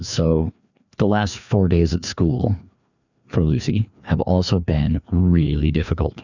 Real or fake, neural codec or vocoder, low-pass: fake; codec, 16 kHz, 2 kbps, FreqCodec, larger model; 7.2 kHz